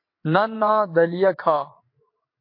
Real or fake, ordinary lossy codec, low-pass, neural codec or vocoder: fake; MP3, 32 kbps; 5.4 kHz; vocoder, 22.05 kHz, 80 mel bands, WaveNeXt